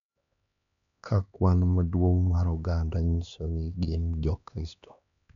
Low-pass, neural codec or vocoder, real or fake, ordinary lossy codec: 7.2 kHz; codec, 16 kHz, 2 kbps, X-Codec, HuBERT features, trained on LibriSpeech; fake; none